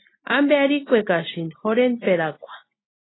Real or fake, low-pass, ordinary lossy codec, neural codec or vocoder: real; 7.2 kHz; AAC, 16 kbps; none